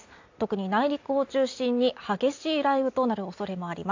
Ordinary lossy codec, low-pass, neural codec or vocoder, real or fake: AAC, 48 kbps; 7.2 kHz; none; real